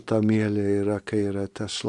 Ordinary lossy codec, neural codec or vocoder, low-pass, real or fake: AAC, 64 kbps; none; 10.8 kHz; real